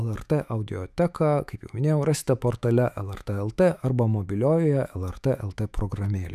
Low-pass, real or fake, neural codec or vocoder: 14.4 kHz; fake; autoencoder, 48 kHz, 128 numbers a frame, DAC-VAE, trained on Japanese speech